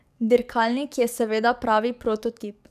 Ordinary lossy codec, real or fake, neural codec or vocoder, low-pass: none; fake; codec, 44.1 kHz, 7.8 kbps, DAC; 14.4 kHz